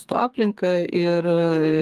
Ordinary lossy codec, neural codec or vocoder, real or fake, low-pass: Opus, 32 kbps; codec, 32 kHz, 1.9 kbps, SNAC; fake; 14.4 kHz